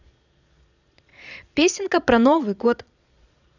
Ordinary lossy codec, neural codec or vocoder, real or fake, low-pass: none; vocoder, 22.05 kHz, 80 mel bands, Vocos; fake; 7.2 kHz